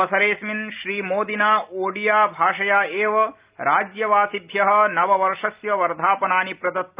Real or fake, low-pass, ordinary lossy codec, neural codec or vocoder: real; 3.6 kHz; Opus, 24 kbps; none